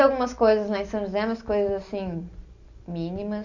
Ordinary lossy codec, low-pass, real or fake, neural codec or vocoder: none; 7.2 kHz; real; none